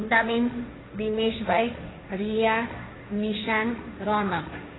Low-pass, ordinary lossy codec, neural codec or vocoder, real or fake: 7.2 kHz; AAC, 16 kbps; codec, 16 kHz, 1.1 kbps, Voila-Tokenizer; fake